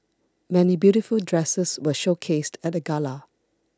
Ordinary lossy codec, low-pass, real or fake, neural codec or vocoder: none; none; real; none